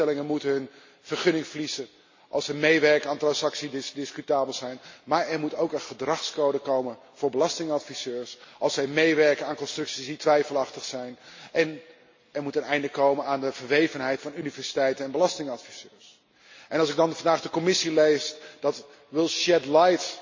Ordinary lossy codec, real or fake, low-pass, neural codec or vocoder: MP3, 32 kbps; real; 7.2 kHz; none